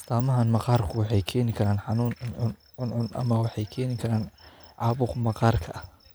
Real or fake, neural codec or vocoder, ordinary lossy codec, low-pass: real; none; none; none